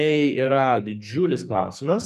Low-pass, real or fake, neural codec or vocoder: 14.4 kHz; fake; codec, 44.1 kHz, 2.6 kbps, SNAC